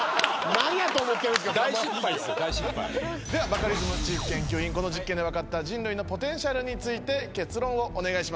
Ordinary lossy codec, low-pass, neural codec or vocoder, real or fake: none; none; none; real